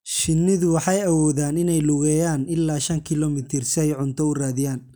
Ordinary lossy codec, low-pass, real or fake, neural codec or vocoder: none; none; real; none